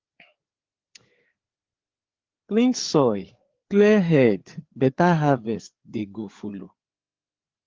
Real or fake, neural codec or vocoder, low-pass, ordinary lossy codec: fake; codec, 16 kHz, 4 kbps, FreqCodec, larger model; 7.2 kHz; Opus, 16 kbps